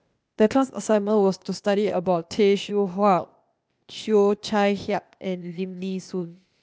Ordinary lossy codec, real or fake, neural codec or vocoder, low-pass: none; fake; codec, 16 kHz, 0.8 kbps, ZipCodec; none